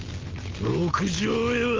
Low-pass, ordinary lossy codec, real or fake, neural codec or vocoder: 7.2 kHz; Opus, 24 kbps; real; none